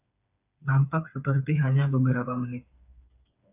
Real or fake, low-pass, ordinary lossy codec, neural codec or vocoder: fake; 3.6 kHz; AAC, 32 kbps; codec, 16 kHz, 4 kbps, FreqCodec, smaller model